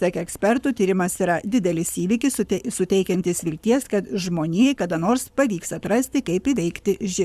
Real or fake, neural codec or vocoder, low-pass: fake; codec, 44.1 kHz, 7.8 kbps, Pupu-Codec; 14.4 kHz